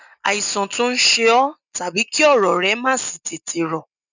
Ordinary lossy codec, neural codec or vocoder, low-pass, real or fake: none; none; 7.2 kHz; real